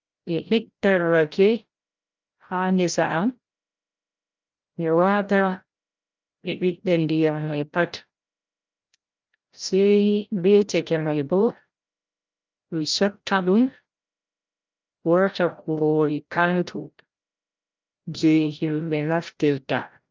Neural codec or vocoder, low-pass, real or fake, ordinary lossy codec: codec, 16 kHz, 0.5 kbps, FreqCodec, larger model; 7.2 kHz; fake; Opus, 32 kbps